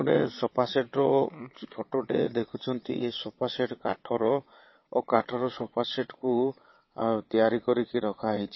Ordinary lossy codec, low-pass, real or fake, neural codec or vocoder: MP3, 24 kbps; 7.2 kHz; fake; vocoder, 22.05 kHz, 80 mel bands, WaveNeXt